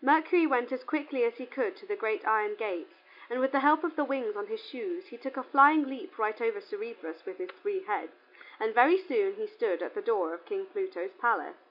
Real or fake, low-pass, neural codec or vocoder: real; 5.4 kHz; none